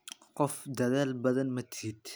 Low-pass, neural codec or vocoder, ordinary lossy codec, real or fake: none; none; none; real